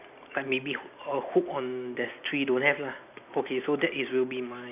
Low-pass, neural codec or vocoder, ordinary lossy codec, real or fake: 3.6 kHz; none; none; real